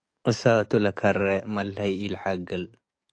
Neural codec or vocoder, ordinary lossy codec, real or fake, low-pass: codec, 44.1 kHz, 7.8 kbps, DAC; AAC, 48 kbps; fake; 9.9 kHz